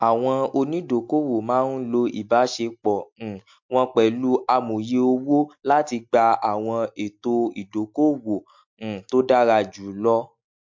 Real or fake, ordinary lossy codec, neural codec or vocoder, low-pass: real; MP3, 48 kbps; none; 7.2 kHz